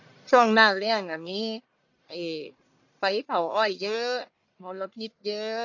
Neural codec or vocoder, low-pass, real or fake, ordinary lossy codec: codec, 44.1 kHz, 1.7 kbps, Pupu-Codec; 7.2 kHz; fake; AAC, 48 kbps